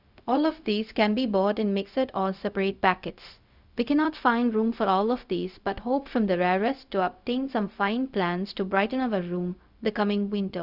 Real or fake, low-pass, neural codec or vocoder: fake; 5.4 kHz; codec, 16 kHz, 0.4 kbps, LongCat-Audio-Codec